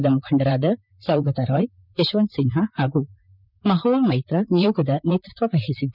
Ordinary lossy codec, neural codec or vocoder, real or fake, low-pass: none; vocoder, 44.1 kHz, 128 mel bands, Pupu-Vocoder; fake; 5.4 kHz